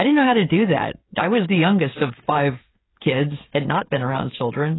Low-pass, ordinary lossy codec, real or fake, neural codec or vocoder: 7.2 kHz; AAC, 16 kbps; fake; codec, 16 kHz, 16 kbps, FreqCodec, smaller model